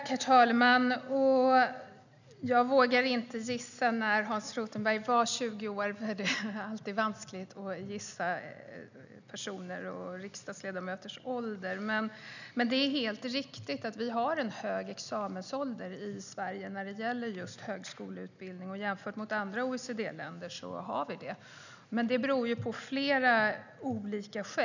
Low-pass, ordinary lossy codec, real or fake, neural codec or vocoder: 7.2 kHz; none; real; none